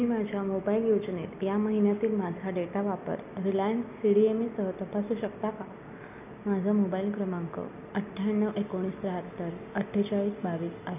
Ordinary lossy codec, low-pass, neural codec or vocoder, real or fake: none; 3.6 kHz; none; real